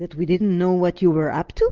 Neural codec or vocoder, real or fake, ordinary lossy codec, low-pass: none; real; Opus, 32 kbps; 7.2 kHz